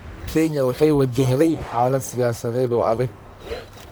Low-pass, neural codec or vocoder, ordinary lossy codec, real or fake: none; codec, 44.1 kHz, 1.7 kbps, Pupu-Codec; none; fake